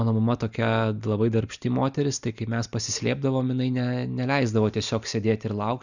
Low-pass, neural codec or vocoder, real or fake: 7.2 kHz; none; real